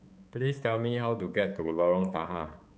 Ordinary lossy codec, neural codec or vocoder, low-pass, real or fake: none; codec, 16 kHz, 4 kbps, X-Codec, HuBERT features, trained on balanced general audio; none; fake